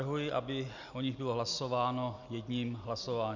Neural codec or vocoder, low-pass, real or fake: none; 7.2 kHz; real